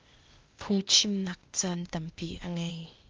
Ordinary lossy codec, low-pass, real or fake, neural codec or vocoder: Opus, 24 kbps; 7.2 kHz; fake; codec, 16 kHz, 0.8 kbps, ZipCodec